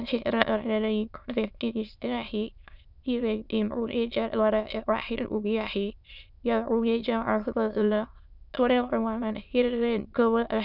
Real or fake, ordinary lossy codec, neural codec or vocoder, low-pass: fake; none; autoencoder, 22.05 kHz, a latent of 192 numbers a frame, VITS, trained on many speakers; 5.4 kHz